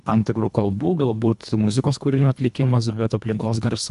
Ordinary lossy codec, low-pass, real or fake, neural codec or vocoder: AAC, 96 kbps; 10.8 kHz; fake; codec, 24 kHz, 1.5 kbps, HILCodec